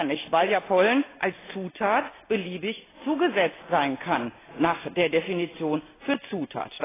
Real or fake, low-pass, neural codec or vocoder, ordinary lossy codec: real; 3.6 kHz; none; AAC, 16 kbps